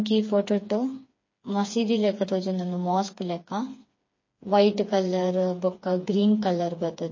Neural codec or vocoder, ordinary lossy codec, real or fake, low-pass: codec, 16 kHz, 4 kbps, FreqCodec, smaller model; MP3, 32 kbps; fake; 7.2 kHz